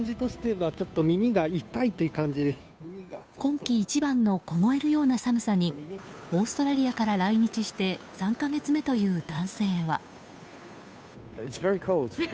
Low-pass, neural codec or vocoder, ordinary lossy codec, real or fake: none; codec, 16 kHz, 2 kbps, FunCodec, trained on Chinese and English, 25 frames a second; none; fake